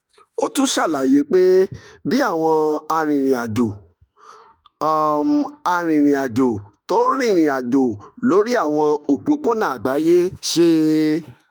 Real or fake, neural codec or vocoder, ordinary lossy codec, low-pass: fake; autoencoder, 48 kHz, 32 numbers a frame, DAC-VAE, trained on Japanese speech; none; none